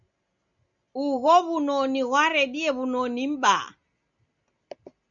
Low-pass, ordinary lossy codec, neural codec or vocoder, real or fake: 7.2 kHz; MP3, 96 kbps; none; real